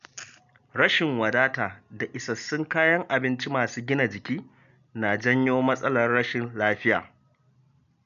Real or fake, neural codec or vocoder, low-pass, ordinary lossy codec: real; none; 7.2 kHz; none